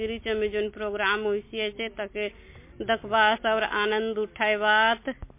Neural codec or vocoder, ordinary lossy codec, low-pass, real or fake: none; MP3, 24 kbps; 3.6 kHz; real